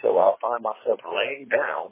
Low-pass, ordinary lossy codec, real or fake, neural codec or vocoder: 3.6 kHz; MP3, 16 kbps; fake; codec, 16 kHz, 4 kbps, FreqCodec, larger model